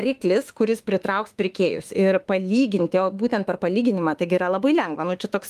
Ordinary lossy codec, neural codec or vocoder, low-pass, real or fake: Opus, 32 kbps; autoencoder, 48 kHz, 32 numbers a frame, DAC-VAE, trained on Japanese speech; 14.4 kHz; fake